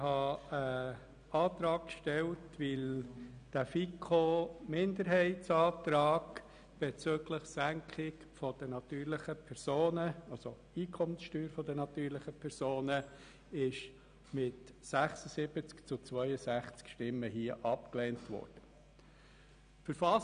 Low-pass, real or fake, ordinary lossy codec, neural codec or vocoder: 9.9 kHz; real; none; none